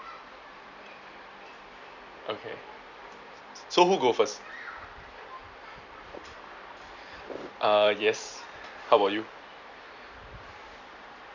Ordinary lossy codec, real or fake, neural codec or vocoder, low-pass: none; fake; vocoder, 44.1 kHz, 128 mel bands every 256 samples, BigVGAN v2; 7.2 kHz